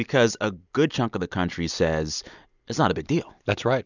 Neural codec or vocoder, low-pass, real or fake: none; 7.2 kHz; real